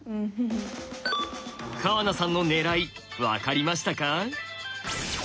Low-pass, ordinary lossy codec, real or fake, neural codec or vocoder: none; none; real; none